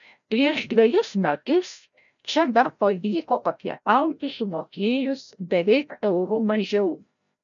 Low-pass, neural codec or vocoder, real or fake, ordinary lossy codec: 7.2 kHz; codec, 16 kHz, 0.5 kbps, FreqCodec, larger model; fake; MP3, 96 kbps